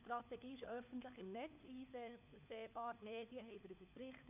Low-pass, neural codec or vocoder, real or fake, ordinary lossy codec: 3.6 kHz; codec, 16 kHz, 4 kbps, FunCodec, trained on LibriTTS, 50 frames a second; fake; none